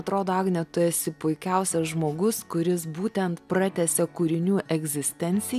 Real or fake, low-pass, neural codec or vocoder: real; 14.4 kHz; none